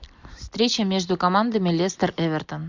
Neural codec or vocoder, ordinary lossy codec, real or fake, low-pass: none; MP3, 64 kbps; real; 7.2 kHz